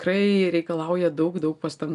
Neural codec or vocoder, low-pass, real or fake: none; 10.8 kHz; real